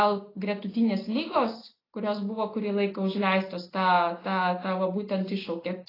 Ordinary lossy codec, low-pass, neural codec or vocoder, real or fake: AAC, 24 kbps; 5.4 kHz; none; real